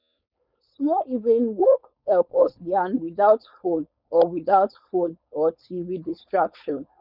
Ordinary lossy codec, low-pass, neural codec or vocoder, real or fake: none; 5.4 kHz; codec, 16 kHz, 4.8 kbps, FACodec; fake